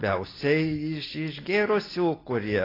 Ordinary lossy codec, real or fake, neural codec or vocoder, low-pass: AAC, 24 kbps; real; none; 5.4 kHz